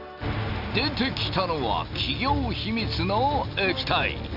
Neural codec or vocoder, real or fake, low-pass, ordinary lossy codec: none; real; 5.4 kHz; none